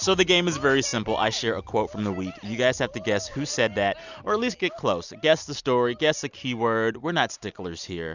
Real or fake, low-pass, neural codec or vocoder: real; 7.2 kHz; none